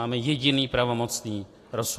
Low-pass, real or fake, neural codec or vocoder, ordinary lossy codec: 14.4 kHz; real; none; AAC, 48 kbps